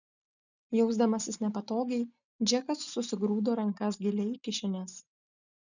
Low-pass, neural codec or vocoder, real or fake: 7.2 kHz; none; real